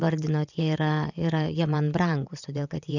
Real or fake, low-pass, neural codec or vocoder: fake; 7.2 kHz; vocoder, 22.05 kHz, 80 mel bands, WaveNeXt